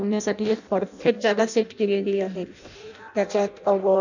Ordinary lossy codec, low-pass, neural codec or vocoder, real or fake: none; 7.2 kHz; codec, 16 kHz in and 24 kHz out, 0.6 kbps, FireRedTTS-2 codec; fake